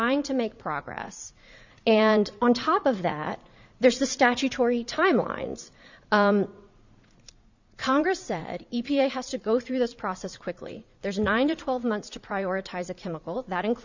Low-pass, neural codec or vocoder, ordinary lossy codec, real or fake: 7.2 kHz; vocoder, 44.1 kHz, 80 mel bands, Vocos; Opus, 64 kbps; fake